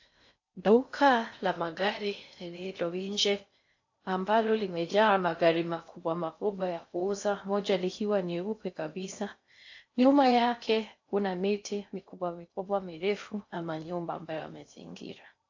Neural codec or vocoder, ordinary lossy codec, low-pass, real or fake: codec, 16 kHz in and 24 kHz out, 0.6 kbps, FocalCodec, streaming, 4096 codes; AAC, 48 kbps; 7.2 kHz; fake